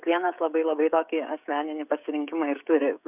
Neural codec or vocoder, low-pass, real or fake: codec, 16 kHz, 16 kbps, FreqCodec, smaller model; 3.6 kHz; fake